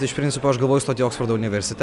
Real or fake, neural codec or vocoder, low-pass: real; none; 10.8 kHz